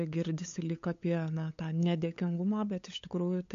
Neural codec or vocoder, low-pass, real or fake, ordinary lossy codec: codec, 16 kHz, 8 kbps, FunCodec, trained on LibriTTS, 25 frames a second; 7.2 kHz; fake; MP3, 48 kbps